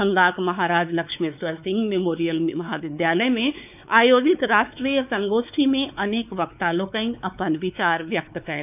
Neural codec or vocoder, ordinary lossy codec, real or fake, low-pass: codec, 16 kHz, 4 kbps, X-Codec, WavLM features, trained on Multilingual LibriSpeech; none; fake; 3.6 kHz